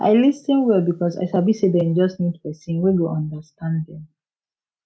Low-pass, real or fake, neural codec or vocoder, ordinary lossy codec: none; real; none; none